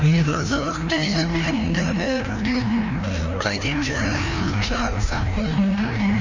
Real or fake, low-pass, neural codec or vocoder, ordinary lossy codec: fake; 7.2 kHz; codec, 16 kHz, 1 kbps, FreqCodec, larger model; MP3, 64 kbps